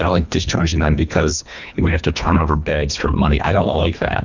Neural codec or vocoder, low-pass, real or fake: codec, 24 kHz, 1.5 kbps, HILCodec; 7.2 kHz; fake